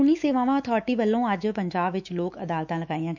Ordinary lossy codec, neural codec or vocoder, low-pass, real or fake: none; autoencoder, 48 kHz, 128 numbers a frame, DAC-VAE, trained on Japanese speech; 7.2 kHz; fake